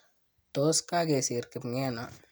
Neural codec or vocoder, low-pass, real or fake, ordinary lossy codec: none; none; real; none